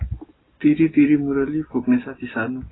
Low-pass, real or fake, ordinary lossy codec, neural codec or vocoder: 7.2 kHz; real; AAC, 16 kbps; none